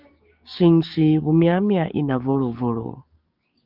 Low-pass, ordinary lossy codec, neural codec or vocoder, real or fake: 5.4 kHz; Opus, 32 kbps; codec, 16 kHz, 6 kbps, DAC; fake